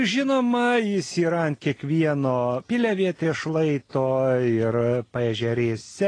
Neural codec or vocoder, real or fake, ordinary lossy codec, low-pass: none; real; AAC, 32 kbps; 9.9 kHz